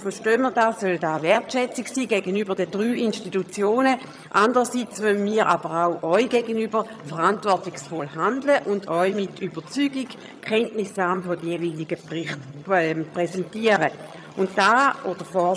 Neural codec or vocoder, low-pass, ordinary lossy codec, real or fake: vocoder, 22.05 kHz, 80 mel bands, HiFi-GAN; none; none; fake